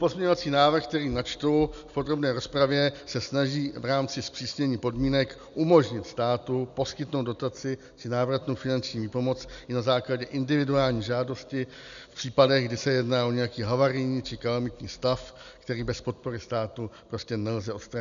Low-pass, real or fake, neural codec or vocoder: 7.2 kHz; real; none